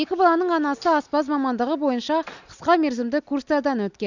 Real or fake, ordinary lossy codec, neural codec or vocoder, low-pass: real; none; none; 7.2 kHz